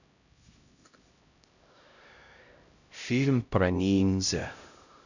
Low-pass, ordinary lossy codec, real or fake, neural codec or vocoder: 7.2 kHz; none; fake; codec, 16 kHz, 0.5 kbps, X-Codec, HuBERT features, trained on LibriSpeech